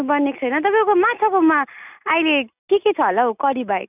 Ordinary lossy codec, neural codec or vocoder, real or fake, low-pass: none; none; real; 3.6 kHz